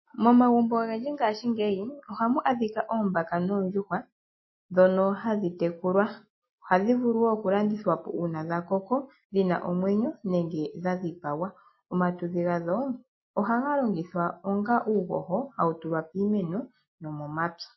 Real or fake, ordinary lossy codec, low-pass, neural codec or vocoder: real; MP3, 24 kbps; 7.2 kHz; none